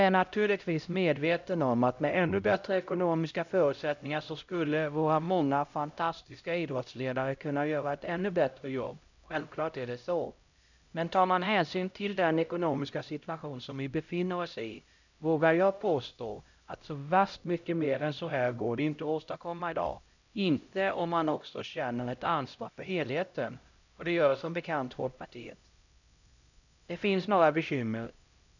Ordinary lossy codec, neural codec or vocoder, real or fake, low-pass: none; codec, 16 kHz, 0.5 kbps, X-Codec, HuBERT features, trained on LibriSpeech; fake; 7.2 kHz